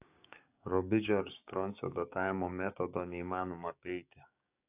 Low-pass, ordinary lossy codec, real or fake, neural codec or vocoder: 3.6 kHz; AAC, 32 kbps; fake; codec, 16 kHz, 6 kbps, DAC